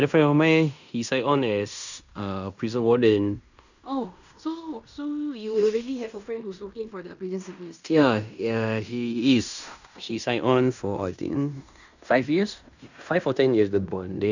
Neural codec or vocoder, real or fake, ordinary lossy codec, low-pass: codec, 16 kHz in and 24 kHz out, 0.9 kbps, LongCat-Audio-Codec, fine tuned four codebook decoder; fake; none; 7.2 kHz